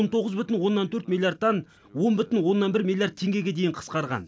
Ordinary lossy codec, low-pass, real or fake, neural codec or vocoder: none; none; real; none